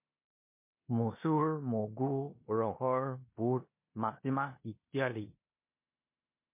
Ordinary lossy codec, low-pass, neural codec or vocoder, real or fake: MP3, 24 kbps; 3.6 kHz; codec, 16 kHz in and 24 kHz out, 0.9 kbps, LongCat-Audio-Codec, four codebook decoder; fake